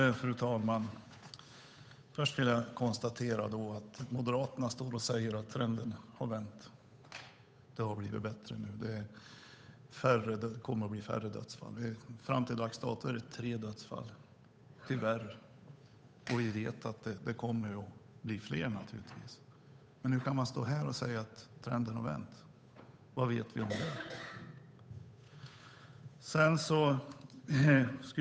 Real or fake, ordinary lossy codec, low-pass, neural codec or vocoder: fake; none; none; codec, 16 kHz, 8 kbps, FunCodec, trained on Chinese and English, 25 frames a second